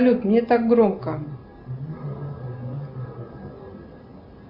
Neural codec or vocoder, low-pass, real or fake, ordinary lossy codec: none; 5.4 kHz; real; Opus, 64 kbps